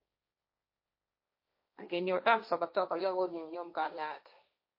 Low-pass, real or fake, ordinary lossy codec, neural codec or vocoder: 5.4 kHz; fake; MP3, 32 kbps; codec, 16 kHz, 1.1 kbps, Voila-Tokenizer